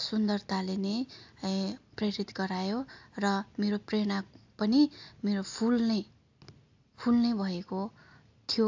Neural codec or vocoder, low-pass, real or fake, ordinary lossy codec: none; 7.2 kHz; real; AAC, 48 kbps